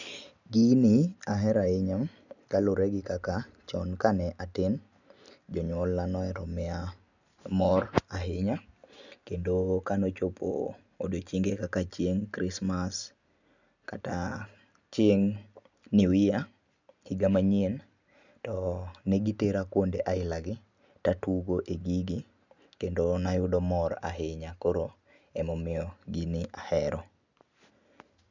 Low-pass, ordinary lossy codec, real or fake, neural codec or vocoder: 7.2 kHz; none; real; none